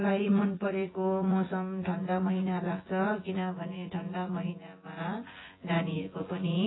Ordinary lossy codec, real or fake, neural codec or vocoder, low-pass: AAC, 16 kbps; fake; vocoder, 24 kHz, 100 mel bands, Vocos; 7.2 kHz